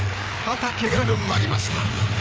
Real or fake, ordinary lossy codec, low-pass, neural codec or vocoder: fake; none; none; codec, 16 kHz, 4 kbps, FreqCodec, larger model